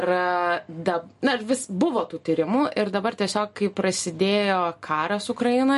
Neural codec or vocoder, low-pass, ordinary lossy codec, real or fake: none; 14.4 kHz; MP3, 48 kbps; real